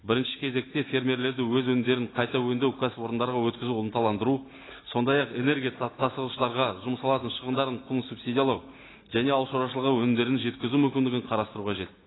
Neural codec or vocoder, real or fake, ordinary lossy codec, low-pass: none; real; AAC, 16 kbps; 7.2 kHz